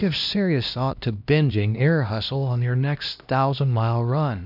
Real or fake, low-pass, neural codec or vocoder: fake; 5.4 kHz; codec, 16 kHz, 1 kbps, X-Codec, HuBERT features, trained on LibriSpeech